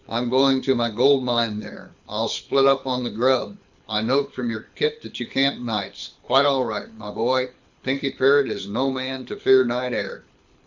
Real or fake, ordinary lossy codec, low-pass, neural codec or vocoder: fake; Opus, 64 kbps; 7.2 kHz; codec, 24 kHz, 6 kbps, HILCodec